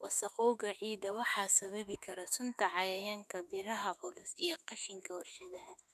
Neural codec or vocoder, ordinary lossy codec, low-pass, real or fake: autoencoder, 48 kHz, 32 numbers a frame, DAC-VAE, trained on Japanese speech; none; 14.4 kHz; fake